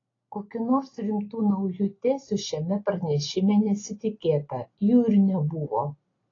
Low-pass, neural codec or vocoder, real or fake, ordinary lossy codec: 7.2 kHz; none; real; AAC, 32 kbps